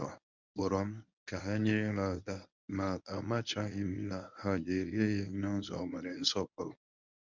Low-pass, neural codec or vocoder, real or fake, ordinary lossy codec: 7.2 kHz; codec, 24 kHz, 0.9 kbps, WavTokenizer, medium speech release version 1; fake; none